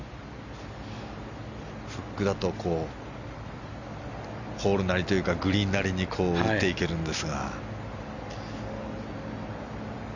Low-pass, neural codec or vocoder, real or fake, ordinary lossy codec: 7.2 kHz; none; real; none